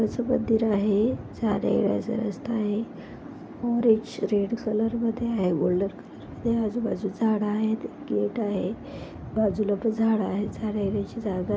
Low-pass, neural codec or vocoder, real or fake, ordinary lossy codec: none; none; real; none